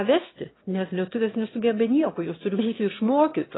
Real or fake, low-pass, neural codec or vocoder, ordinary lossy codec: fake; 7.2 kHz; autoencoder, 22.05 kHz, a latent of 192 numbers a frame, VITS, trained on one speaker; AAC, 16 kbps